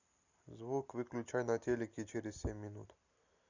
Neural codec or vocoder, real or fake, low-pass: none; real; 7.2 kHz